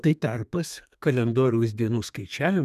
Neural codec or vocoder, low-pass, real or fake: codec, 32 kHz, 1.9 kbps, SNAC; 14.4 kHz; fake